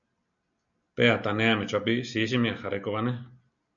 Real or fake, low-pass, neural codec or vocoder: real; 7.2 kHz; none